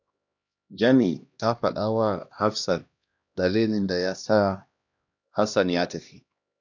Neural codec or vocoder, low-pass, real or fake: codec, 16 kHz, 1 kbps, X-Codec, HuBERT features, trained on LibriSpeech; 7.2 kHz; fake